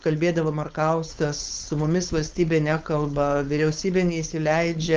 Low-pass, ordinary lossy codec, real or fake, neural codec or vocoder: 7.2 kHz; Opus, 32 kbps; fake; codec, 16 kHz, 4.8 kbps, FACodec